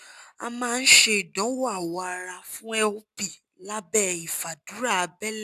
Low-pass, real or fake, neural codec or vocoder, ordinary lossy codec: 14.4 kHz; real; none; none